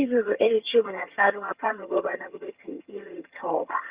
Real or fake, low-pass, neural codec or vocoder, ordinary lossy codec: fake; 3.6 kHz; vocoder, 22.05 kHz, 80 mel bands, HiFi-GAN; Opus, 64 kbps